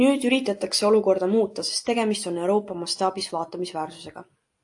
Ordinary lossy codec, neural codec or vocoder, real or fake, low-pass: AAC, 64 kbps; none; real; 10.8 kHz